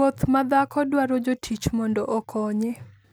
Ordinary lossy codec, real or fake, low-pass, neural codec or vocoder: none; real; none; none